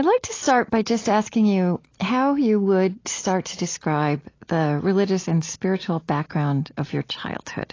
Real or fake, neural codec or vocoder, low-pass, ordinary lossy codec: real; none; 7.2 kHz; AAC, 32 kbps